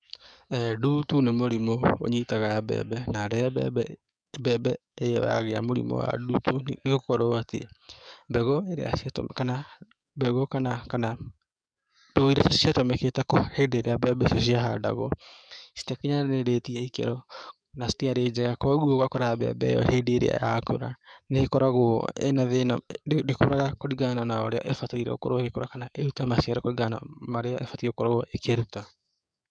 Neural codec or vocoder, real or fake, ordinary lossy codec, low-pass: codec, 44.1 kHz, 7.8 kbps, DAC; fake; MP3, 96 kbps; 9.9 kHz